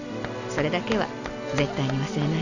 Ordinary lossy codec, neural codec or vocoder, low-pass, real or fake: none; none; 7.2 kHz; real